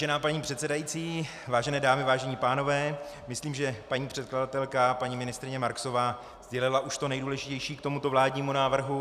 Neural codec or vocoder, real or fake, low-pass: none; real; 14.4 kHz